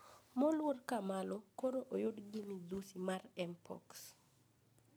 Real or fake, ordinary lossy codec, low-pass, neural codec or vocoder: real; none; none; none